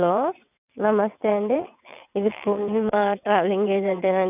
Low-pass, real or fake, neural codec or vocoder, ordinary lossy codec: 3.6 kHz; fake; vocoder, 22.05 kHz, 80 mel bands, WaveNeXt; none